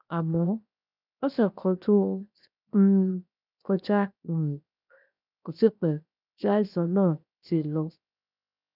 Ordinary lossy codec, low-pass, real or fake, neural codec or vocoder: none; 5.4 kHz; fake; codec, 16 kHz, 0.7 kbps, FocalCodec